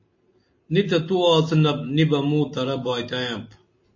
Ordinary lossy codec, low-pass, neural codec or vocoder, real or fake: MP3, 32 kbps; 7.2 kHz; none; real